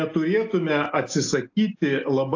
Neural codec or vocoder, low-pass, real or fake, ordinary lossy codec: none; 7.2 kHz; real; AAC, 48 kbps